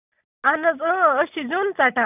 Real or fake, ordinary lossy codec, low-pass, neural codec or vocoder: real; none; 3.6 kHz; none